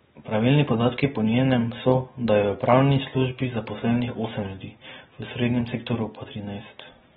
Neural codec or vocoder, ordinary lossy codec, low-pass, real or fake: none; AAC, 16 kbps; 10.8 kHz; real